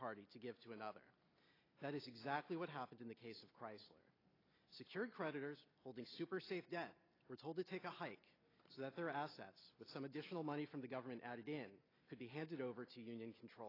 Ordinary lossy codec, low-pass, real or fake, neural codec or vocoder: AAC, 24 kbps; 5.4 kHz; real; none